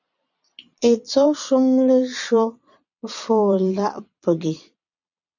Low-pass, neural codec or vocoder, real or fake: 7.2 kHz; vocoder, 22.05 kHz, 80 mel bands, Vocos; fake